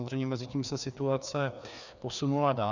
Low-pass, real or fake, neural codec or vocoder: 7.2 kHz; fake; codec, 16 kHz, 2 kbps, FreqCodec, larger model